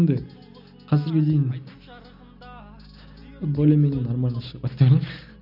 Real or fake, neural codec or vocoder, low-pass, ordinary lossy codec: real; none; 5.4 kHz; none